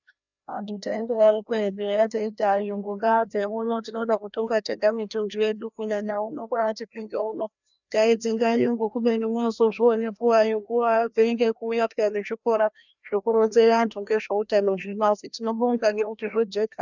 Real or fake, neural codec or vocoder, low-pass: fake; codec, 16 kHz, 1 kbps, FreqCodec, larger model; 7.2 kHz